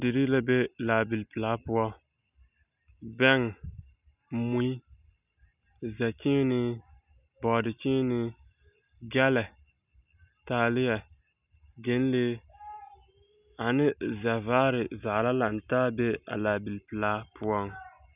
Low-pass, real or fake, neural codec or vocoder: 3.6 kHz; real; none